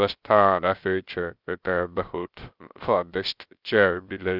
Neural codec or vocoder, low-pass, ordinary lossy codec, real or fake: codec, 16 kHz, 0.3 kbps, FocalCodec; 5.4 kHz; Opus, 24 kbps; fake